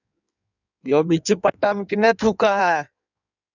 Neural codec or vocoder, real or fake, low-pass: codec, 16 kHz in and 24 kHz out, 1.1 kbps, FireRedTTS-2 codec; fake; 7.2 kHz